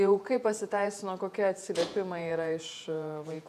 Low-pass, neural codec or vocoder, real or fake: 14.4 kHz; vocoder, 48 kHz, 128 mel bands, Vocos; fake